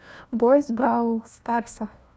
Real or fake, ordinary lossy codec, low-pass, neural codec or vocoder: fake; none; none; codec, 16 kHz, 1 kbps, FunCodec, trained on LibriTTS, 50 frames a second